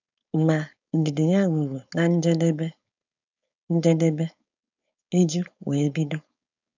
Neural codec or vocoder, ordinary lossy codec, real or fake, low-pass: codec, 16 kHz, 4.8 kbps, FACodec; none; fake; 7.2 kHz